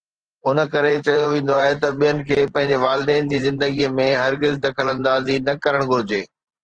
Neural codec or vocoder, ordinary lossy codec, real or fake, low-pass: vocoder, 44.1 kHz, 128 mel bands every 512 samples, BigVGAN v2; Opus, 16 kbps; fake; 9.9 kHz